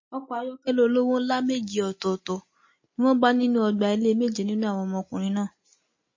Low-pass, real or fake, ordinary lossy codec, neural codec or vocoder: 7.2 kHz; real; MP3, 32 kbps; none